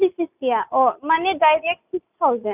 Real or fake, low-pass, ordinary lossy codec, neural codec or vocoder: real; 3.6 kHz; none; none